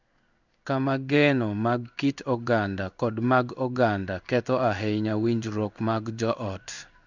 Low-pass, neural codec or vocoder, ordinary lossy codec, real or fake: 7.2 kHz; codec, 16 kHz in and 24 kHz out, 1 kbps, XY-Tokenizer; none; fake